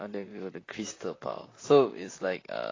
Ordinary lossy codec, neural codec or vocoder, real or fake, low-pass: AAC, 32 kbps; vocoder, 44.1 kHz, 128 mel bands, Pupu-Vocoder; fake; 7.2 kHz